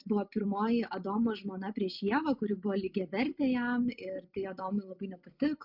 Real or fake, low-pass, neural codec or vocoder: real; 5.4 kHz; none